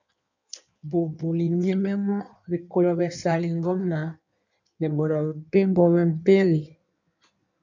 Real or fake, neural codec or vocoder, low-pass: fake; codec, 16 kHz in and 24 kHz out, 1.1 kbps, FireRedTTS-2 codec; 7.2 kHz